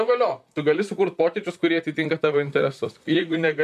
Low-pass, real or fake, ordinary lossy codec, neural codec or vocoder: 14.4 kHz; fake; MP3, 96 kbps; vocoder, 44.1 kHz, 128 mel bands, Pupu-Vocoder